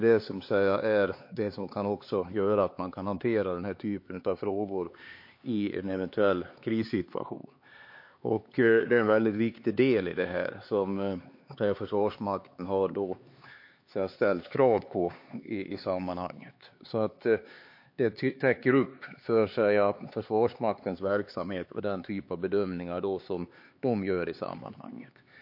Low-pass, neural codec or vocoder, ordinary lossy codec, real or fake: 5.4 kHz; codec, 16 kHz, 4 kbps, X-Codec, HuBERT features, trained on LibriSpeech; MP3, 32 kbps; fake